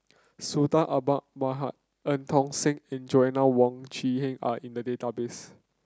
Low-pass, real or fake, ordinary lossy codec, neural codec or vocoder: none; real; none; none